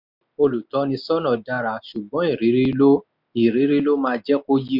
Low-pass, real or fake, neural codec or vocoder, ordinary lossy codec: 5.4 kHz; real; none; none